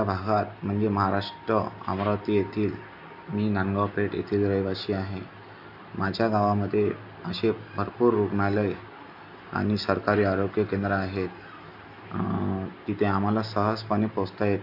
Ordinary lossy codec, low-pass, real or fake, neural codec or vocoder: AAC, 48 kbps; 5.4 kHz; real; none